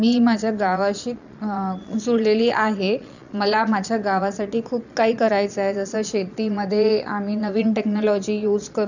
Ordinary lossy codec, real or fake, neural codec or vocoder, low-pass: none; fake; vocoder, 22.05 kHz, 80 mel bands, Vocos; 7.2 kHz